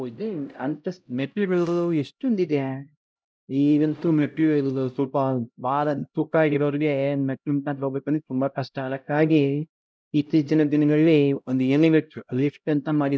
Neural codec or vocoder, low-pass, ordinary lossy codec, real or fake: codec, 16 kHz, 0.5 kbps, X-Codec, HuBERT features, trained on LibriSpeech; none; none; fake